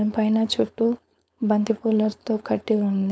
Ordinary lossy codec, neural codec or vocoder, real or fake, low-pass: none; codec, 16 kHz, 4.8 kbps, FACodec; fake; none